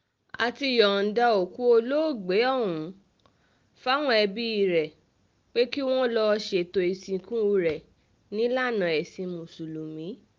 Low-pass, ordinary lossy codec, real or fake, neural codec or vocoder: 7.2 kHz; Opus, 24 kbps; real; none